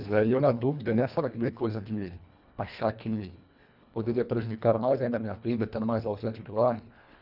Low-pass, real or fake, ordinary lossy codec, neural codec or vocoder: 5.4 kHz; fake; none; codec, 24 kHz, 1.5 kbps, HILCodec